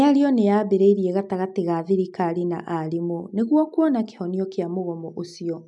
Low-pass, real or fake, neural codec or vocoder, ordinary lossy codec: 10.8 kHz; real; none; MP3, 96 kbps